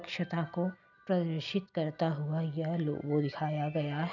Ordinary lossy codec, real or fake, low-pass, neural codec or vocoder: none; real; 7.2 kHz; none